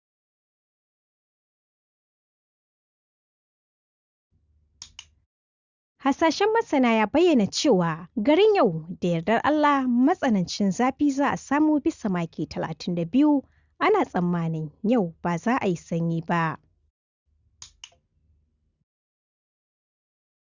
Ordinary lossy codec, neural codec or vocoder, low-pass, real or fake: Opus, 64 kbps; none; 7.2 kHz; real